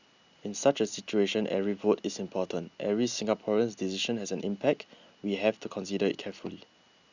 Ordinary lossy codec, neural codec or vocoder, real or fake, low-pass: Opus, 64 kbps; none; real; 7.2 kHz